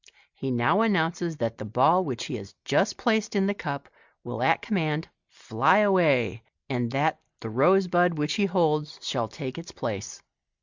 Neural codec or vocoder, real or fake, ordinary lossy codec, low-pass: none; real; Opus, 64 kbps; 7.2 kHz